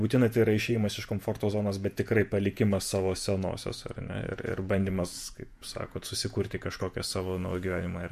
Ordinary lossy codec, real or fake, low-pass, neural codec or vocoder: MP3, 64 kbps; fake; 14.4 kHz; vocoder, 48 kHz, 128 mel bands, Vocos